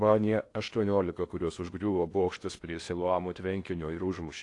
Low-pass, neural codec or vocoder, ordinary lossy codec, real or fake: 10.8 kHz; codec, 16 kHz in and 24 kHz out, 0.8 kbps, FocalCodec, streaming, 65536 codes; AAC, 48 kbps; fake